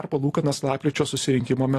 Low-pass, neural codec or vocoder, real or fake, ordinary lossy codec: 14.4 kHz; vocoder, 44.1 kHz, 128 mel bands every 256 samples, BigVGAN v2; fake; AAC, 64 kbps